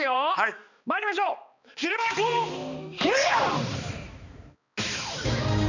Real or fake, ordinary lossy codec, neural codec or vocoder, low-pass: fake; none; codec, 16 kHz, 2 kbps, X-Codec, HuBERT features, trained on balanced general audio; 7.2 kHz